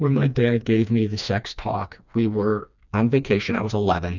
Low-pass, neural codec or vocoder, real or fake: 7.2 kHz; codec, 16 kHz, 2 kbps, FreqCodec, smaller model; fake